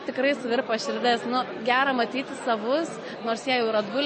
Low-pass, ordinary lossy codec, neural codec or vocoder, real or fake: 10.8 kHz; MP3, 32 kbps; none; real